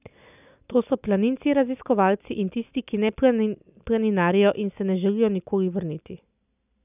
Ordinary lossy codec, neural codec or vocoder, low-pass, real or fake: none; none; 3.6 kHz; real